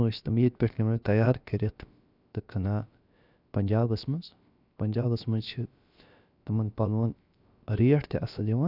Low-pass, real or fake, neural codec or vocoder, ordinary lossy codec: 5.4 kHz; fake; codec, 16 kHz, about 1 kbps, DyCAST, with the encoder's durations; none